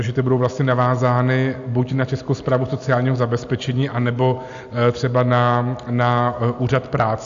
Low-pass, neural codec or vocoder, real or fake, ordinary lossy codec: 7.2 kHz; none; real; AAC, 64 kbps